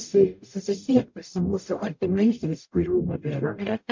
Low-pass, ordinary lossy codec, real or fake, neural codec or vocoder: 7.2 kHz; MP3, 48 kbps; fake; codec, 44.1 kHz, 0.9 kbps, DAC